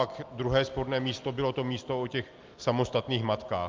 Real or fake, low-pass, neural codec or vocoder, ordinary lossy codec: real; 7.2 kHz; none; Opus, 24 kbps